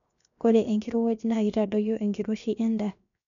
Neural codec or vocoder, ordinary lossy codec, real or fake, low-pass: codec, 16 kHz, 0.7 kbps, FocalCodec; none; fake; 7.2 kHz